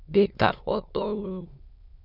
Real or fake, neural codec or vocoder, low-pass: fake; autoencoder, 22.05 kHz, a latent of 192 numbers a frame, VITS, trained on many speakers; 5.4 kHz